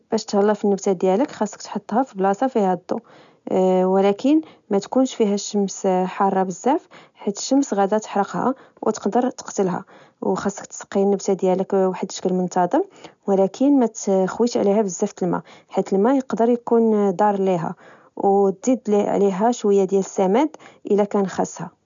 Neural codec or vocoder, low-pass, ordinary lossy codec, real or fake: none; 7.2 kHz; none; real